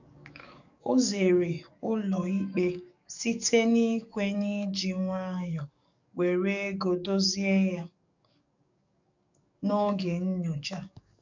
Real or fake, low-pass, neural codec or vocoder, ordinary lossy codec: fake; 7.2 kHz; codec, 44.1 kHz, 7.8 kbps, DAC; none